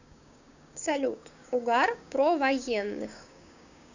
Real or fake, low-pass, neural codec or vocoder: fake; 7.2 kHz; codec, 16 kHz, 6 kbps, DAC